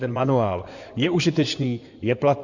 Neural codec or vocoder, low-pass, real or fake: codec, 16 kHz in and 24 kHz out, 2.2 kbps, FireRedTTS-2 codec; 7.2 kHz; fake